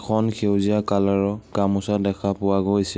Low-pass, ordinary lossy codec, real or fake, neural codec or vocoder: none; none; real; none